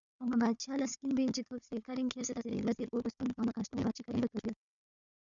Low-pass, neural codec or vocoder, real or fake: 7.2 kHz; codec, 16 kHz, 4 kbps, FunCodec, trained on Chinese and English, 50 frames a second; fake